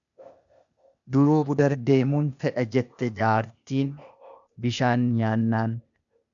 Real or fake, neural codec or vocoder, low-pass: fake; codec, 16 kHz, 0.8 kbps, ZipCodec; 7.2 kHz